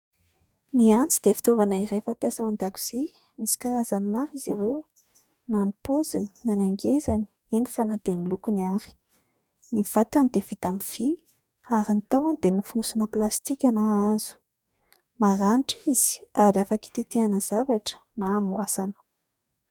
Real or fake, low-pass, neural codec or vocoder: fake; 19.8 kHz; codec, 44.1 kHz, 2.6 kbps, DAC